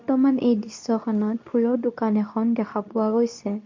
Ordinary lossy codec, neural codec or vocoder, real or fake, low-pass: MP3, 48 kbps; codec, 24 kHz, 0.9 kbps, WavTokenizer, medium speech release version 2; fake; 7.2 kHz